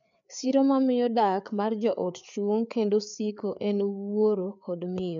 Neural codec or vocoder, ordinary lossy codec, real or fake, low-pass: codec, 16 kHz, 4 kbps, FreqCodec, larger model; MP3, 96 kbps; fake; 7.2 kHz